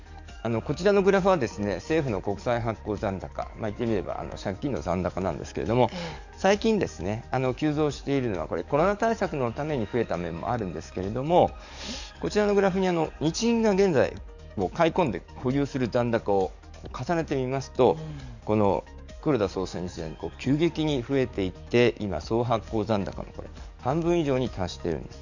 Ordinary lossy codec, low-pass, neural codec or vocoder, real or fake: none; 7.2 kHz; codec, 44.1 kHz, 7.8 kbps, DAC; fake